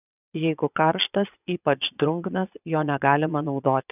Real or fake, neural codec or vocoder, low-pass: fake; vocoder, 22.05 kHz, 80 mel bands, Vocos; 3.6 kHz